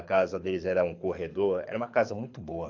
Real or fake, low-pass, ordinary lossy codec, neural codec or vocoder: fake; 7.2 kHz; AAC, 48 kbps; codec, 24 kHz, 6 kbps, HILCodec